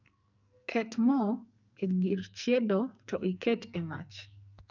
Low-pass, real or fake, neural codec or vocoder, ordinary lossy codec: 7.2 kHz; fake; codec, 44.1 kHz, 2.6 kbps, SNAC; none